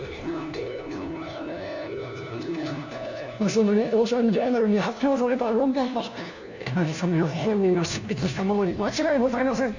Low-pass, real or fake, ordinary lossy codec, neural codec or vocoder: 7.2 kHz; fake; none; codec, 16 kHz, 1 kbps, FunCodec, trained on LibriTTS, 50 frames a second